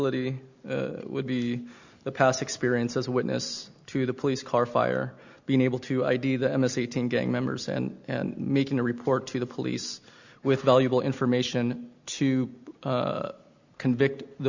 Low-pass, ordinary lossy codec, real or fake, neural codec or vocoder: 7.2 kHz; Opus, 64 kbps; real; none